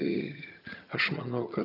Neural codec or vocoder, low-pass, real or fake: vocoder, 22.05 kHz, 80 mel bands, HiFi-GAN; 5.4 kHz; fake